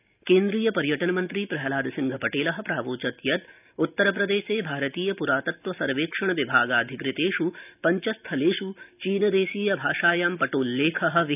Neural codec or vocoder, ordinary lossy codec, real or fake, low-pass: none; none; real; 3.6 kHz